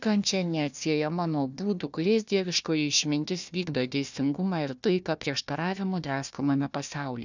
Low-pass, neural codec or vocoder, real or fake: 7.2 kHz; codec, 16 kHz, 1 kbps, FunCodec, trained on Chinese and English, 50 frames a second; fake